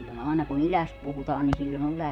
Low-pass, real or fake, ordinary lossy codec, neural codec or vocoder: 19.8 kHz; fake; none; vocoder, 44.1 kHz, 128 mel bands, Pupu-Vocoder